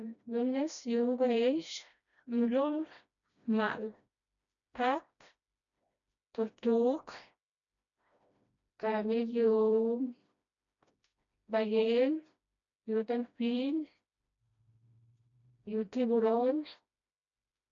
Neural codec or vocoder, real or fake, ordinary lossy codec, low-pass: codec, 16 kHz, 1 kbps, FreqCodec, smaller model; fake; none; 7.2 kHz